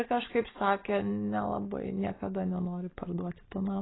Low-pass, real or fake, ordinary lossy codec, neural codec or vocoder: 7.2 kHz; real; AAC, 16 kbps; none